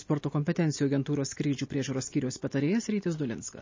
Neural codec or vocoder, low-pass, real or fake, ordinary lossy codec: none; 7.2 kHz; real; MP3, 32 kbps